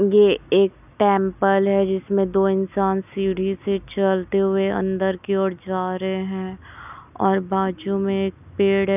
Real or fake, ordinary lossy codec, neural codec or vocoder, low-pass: real; none; none; 3.6 kHz